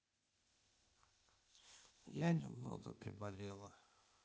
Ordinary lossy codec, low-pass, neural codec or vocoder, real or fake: none; none; codec, 16 kHz, 0.8 kbps, ZipCodec; fake